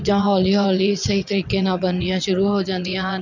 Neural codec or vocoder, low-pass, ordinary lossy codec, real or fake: vocoder, 22.05 kHz, 80 mel bands, WaveNeXt; 7.2 kHz; none; fake